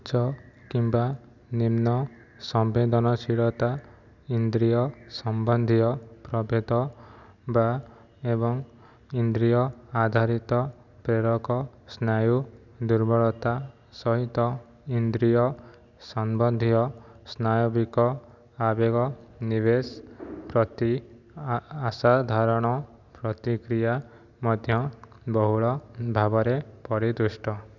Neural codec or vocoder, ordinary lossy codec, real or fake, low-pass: none; none; real; 7.2 kHz